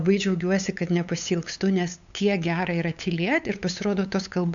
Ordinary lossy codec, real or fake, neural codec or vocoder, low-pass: AAC, 64 kbps; fake; codec, 16 kHz, 8 kbps, FunCodec, trained on LibriTTS, 25 frames a second; 7.2 kHz